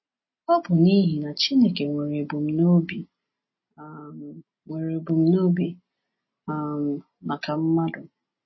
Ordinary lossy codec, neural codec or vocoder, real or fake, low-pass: MP3, 24 kbps; none; real; 7.2 kHz